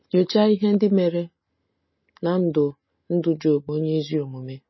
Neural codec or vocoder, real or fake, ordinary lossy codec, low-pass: none; real; MP3, 24 kbps; 7.2 kHz